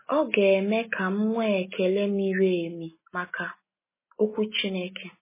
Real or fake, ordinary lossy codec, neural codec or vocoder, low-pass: real; MP3, 16 kbps; none; 3.6 kHz